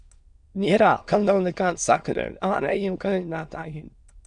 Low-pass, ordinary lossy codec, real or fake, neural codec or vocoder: 9.9 kHz; Opus, 64 kbps; fake; autoencoder, 22.05 kHz, a latent of 192 numbers a frame, VITS, trained on many speakers